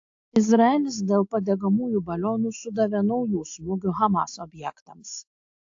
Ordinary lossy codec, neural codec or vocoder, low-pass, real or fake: AAC, 48 kbps; none; 7.2 kHz; real